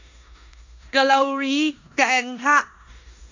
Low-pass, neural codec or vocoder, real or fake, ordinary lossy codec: 7.2 kHz; codec, 16 kHz in and 24 kHz out, 0.9 kbps, LongCat-Audio-Codec, four codebook decoder; fake; AAC, 48 kbps